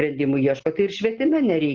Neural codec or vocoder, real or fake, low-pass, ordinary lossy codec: none; real; 7.2 kHz; Opus, 16 kbps